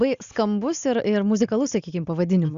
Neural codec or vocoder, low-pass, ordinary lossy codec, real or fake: none; 7.2 kHz; Opus, 64 kbps; real